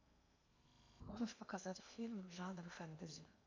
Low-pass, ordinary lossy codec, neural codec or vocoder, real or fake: 7.2 kHz; MP3, 64 kbps; codec, 16 kHz in and 24 kHz out, 0.8 kbps, FocalCodec, streaming, 65536 codes; fake